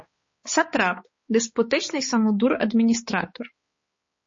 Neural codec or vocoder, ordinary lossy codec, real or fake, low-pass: codec, 16 kHz, 4 kbps, X-Codec, HuBERT features, trained on balanced general audio; MP3, 32 kbps; fake; 7.2 kHz